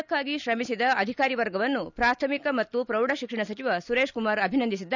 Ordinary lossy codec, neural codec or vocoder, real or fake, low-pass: none; none; real; 7.2 kHz